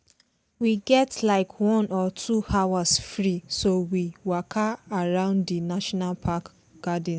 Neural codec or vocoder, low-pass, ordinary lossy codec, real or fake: none; none; none; real